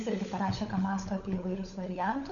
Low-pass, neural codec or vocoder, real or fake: 7.2 kHz; codec, 16 kHz, 16 kbps, FunCodec, trained on LibriTTS, 50 frames a second; fake